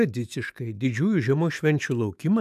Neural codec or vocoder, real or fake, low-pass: autoencoder, 48 kHz, 128 numbers a frame, DAC-VAE, trained on Japanese speech; fake; 14.4 kHz